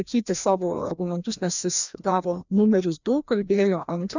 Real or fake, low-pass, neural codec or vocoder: fake; 7.2 kHz; codec, 16 kHz, 1 kbps, FreqCodec, larger model